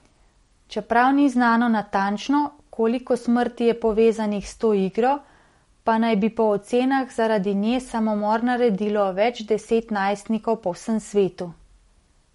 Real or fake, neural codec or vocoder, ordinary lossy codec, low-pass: real; none; MP3, 48 kbps; 19.8 kHz